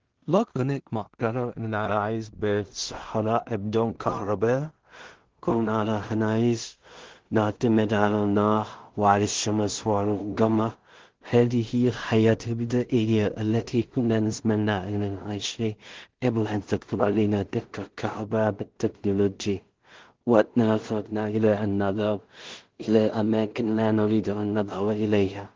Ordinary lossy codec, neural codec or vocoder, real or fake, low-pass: Opus, 16 kbps; codec, 16 kHz in and 24 kHz out, 0.4 kbps, LongCat-Audio-Codec, two codebook decoder; fake; 7.2 kHz